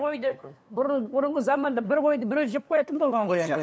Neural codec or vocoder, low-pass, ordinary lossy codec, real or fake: codec, 16 kHz, 2 kbps, FunCodec, trained on LibriTTS, 25 frames a second; none; none; fake